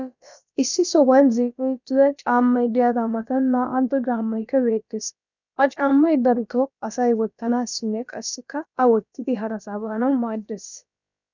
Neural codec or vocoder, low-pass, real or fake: codec, 16 kHz, about 1 kbps, DyCAST, with the encoder's durations; 7.2 kHz; fake